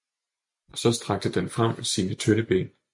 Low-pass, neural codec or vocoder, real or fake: 10.8 kHz; none; real